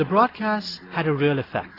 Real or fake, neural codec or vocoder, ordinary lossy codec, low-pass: real; none; AAC, 24 kbps; 5.4 kHz